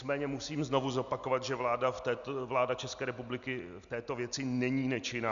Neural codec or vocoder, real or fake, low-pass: none; real; 7.2 kHz